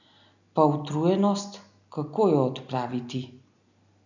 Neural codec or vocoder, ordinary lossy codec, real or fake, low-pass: none; none; real; 7.2 kHz